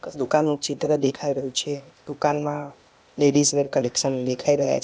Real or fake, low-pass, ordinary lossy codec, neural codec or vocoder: fake; none; none; codec, 16 kHz, 0.8 kbps, ZipCodec